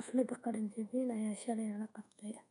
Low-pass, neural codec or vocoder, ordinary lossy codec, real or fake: 10.8 kHz; codec, 24 kHz, 1.2 kbps, DualCodec; none; fake